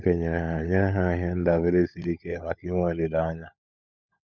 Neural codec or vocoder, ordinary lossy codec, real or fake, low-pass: codec, 16 kHz, 16 kbps, FunCodec, trained on LibriTTS, 50 frames a second; none; fake; 7.2 kHz